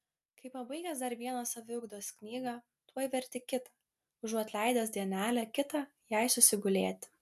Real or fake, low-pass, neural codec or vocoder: real; 14.4 kHz; none